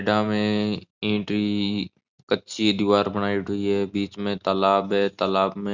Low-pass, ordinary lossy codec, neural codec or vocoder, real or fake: 7.2 kHz; Opus, 64 kbps; none; real